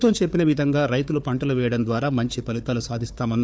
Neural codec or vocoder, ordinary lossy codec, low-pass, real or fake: codec, 16 kHz, 4 kbps, FunCodec, trained on Chinese and English, 50 frames a second; none; none; fake